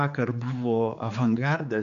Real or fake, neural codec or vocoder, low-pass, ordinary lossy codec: fake; codec, 16 kHz, 4 kbps, X-Codec, HuBERT features, trained on general audio; 7.2 kHz; AAC, 96 kbps